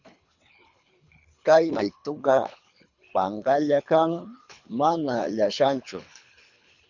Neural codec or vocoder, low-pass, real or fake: codec, 24 kHz, 3 kbps, HILCodec; 7.2 kHz; fake